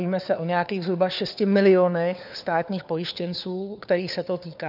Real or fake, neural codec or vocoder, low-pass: fake; codec, 16 kHz, 4 kbps, FunCodec, trained on LibriTTS, 50 frames a second; 5.4 kHz